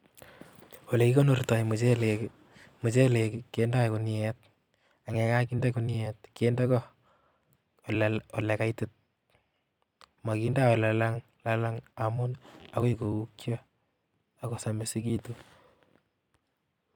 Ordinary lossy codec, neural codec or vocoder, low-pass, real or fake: none; vocoder, 44.1 kHz, 128 mel bands every 256 samples, BigVGAN v2; 19.8 kHz; fake